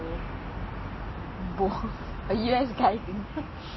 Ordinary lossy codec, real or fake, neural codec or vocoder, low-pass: MP3, 24 kbps; real; none; 7.2 kHz